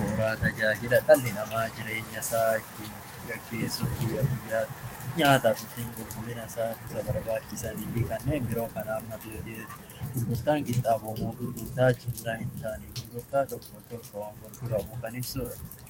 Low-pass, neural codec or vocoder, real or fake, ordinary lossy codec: 19.8 kHz; codec, 44.1 kHz, 7.8 kbps, DAC; fake; MP3, 64 kbps